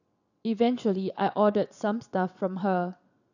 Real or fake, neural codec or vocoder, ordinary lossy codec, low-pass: fake; vocoder, 22.05 kHz, 80 mel bands, WaveNeXt; none; 7.2 kHz